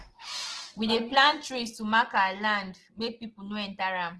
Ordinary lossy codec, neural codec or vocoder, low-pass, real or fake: Opus, 16 kbps; none; 10.8 kHz; real